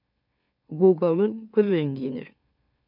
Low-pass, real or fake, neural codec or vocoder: 5.4 kHz; fake; autoencoder, 44.1 kHz, a latent of 192 numbers a frame, MeloTTS